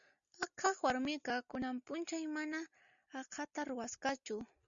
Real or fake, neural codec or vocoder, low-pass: real; none; 7.2 kHz